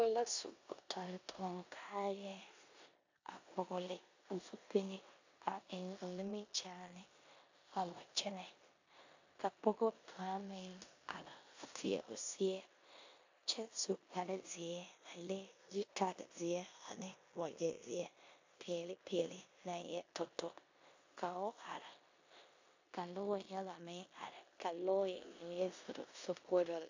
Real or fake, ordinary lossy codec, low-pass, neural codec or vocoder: fake; AAC, 48 kbps; 7.2 kHz; codec, 16 kHz in and 24 kHz out, 0.9 kbps, LongCat-Audio-Codec, four codebook decoder